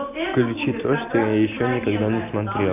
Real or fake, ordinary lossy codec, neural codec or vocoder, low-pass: real; MP3, 32 kbps; none; 3.6 kHz